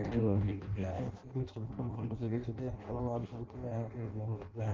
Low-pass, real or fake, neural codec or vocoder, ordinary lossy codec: 7.2 kHz; fake; codec, 16 kHz in and 24 kHz out, 0.6 kbps, FireRedTTS-2 codec; Opus, 16 kbps